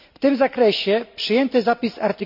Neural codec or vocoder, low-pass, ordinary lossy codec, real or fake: none; 5.4 kHz; none; real